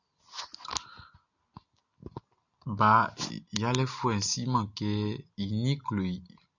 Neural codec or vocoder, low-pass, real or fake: none; 7.2 kHz; real